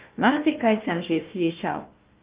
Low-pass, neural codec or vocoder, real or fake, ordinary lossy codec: 3.6 kHz; codec, 16 kHz, 1 kbps, FunCodec, trained on Chinese and English, 50 frames a second; fake; Opus, 32 kbps